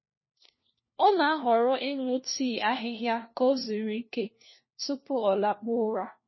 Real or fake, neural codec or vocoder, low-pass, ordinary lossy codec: fake; codec, 16 kHz, 1 kbps, FunCodec, trained on LibriTTS, 50 frames a second; 7.2 kHz; MP3, 24 kbps